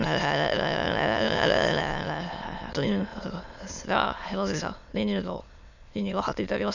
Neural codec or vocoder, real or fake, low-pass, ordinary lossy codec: autoencoder, 22.05 kHz, a latent of 192 numbers a frame, VITS, trained on many speakers; fake; 7.2 kHz; none